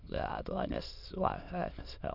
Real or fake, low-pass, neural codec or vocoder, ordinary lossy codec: fake; 5.4 kHz; autoencoder, 22.05 kHz, a latent of 192 numbers a frame, VITS, trained on many speakers; none